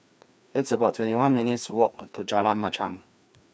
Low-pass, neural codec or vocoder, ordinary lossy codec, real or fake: none; codec, 16 kHz, 2 kbps, FreqCodec, larger model; none; fake